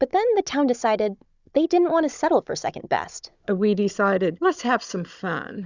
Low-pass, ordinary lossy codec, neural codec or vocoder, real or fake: 7.2 kHz; Opus, 64 kbps; codec, 16 kHz, 16 kbps, FunCodec, trained on Chinese and English, 50 frames a second; fake